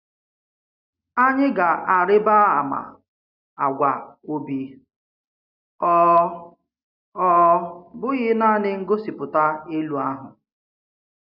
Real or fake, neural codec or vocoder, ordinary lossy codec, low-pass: real; none; none; 5.4 kHz